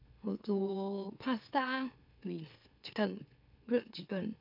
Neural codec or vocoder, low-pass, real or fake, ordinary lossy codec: autoencoder, 44.1 kHz, a latent of 192 numbers a frame, MeloTTS; 5.4 kHz; fake; none